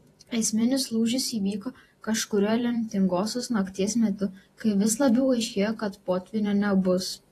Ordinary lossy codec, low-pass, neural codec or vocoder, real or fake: AAC, 48 kbps; 14.4 kHz; vocoder, 48 kHz, 128 mel bands, Vocos; fake